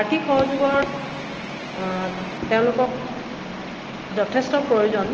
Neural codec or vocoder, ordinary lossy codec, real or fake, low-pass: none; Opus, 16 kbps; real; 7.2 kHz